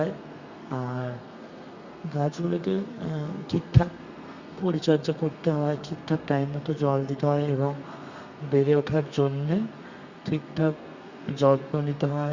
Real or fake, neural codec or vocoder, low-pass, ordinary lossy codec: fake; codec, 32 kHz, 1.9 kbps, SNAC; 7.2 kHz; Opus, 64 kbps